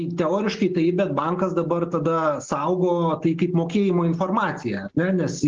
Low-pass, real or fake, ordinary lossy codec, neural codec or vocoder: 7.2 kHz; real; Opus, 16 kbps; none